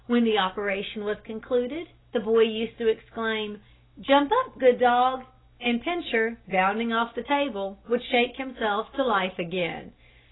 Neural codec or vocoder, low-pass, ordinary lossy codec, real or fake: none; 7.2 kHz; AAC, 16 kbps; real